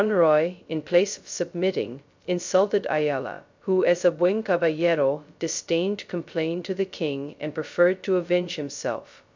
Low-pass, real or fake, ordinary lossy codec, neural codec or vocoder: 7.2 kHz; fake; MP3, 64 kbps; codec, 16 kHz, 0.2 kbps, FocalCodec